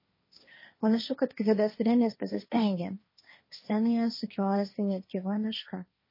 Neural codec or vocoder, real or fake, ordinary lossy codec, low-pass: codec, 16 kHz, 1.1 kbps, Voila-Tokenizer; fake; MP3, 24 kbps; 5.4 kHz